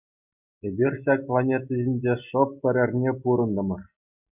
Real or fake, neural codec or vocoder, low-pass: real; none; 3.6 kHz